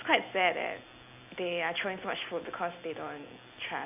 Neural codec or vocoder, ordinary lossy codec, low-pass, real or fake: none; none; 3.6 kHz; real